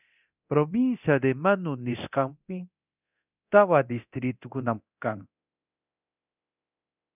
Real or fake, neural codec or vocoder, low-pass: fake; codec, 24 kHz, 0.9 kbps, DualCodec; 3.6 kHz